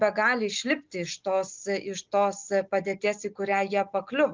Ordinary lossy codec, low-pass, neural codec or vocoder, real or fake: Opus, 24 kbps; 7.2 kHz; none; real